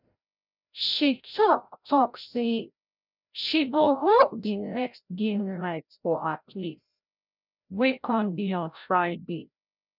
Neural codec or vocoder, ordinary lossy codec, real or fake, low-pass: codec, 16 kHz, 0.5 kbps, FreqCodec, larger model; none; fake; 5.4 kHz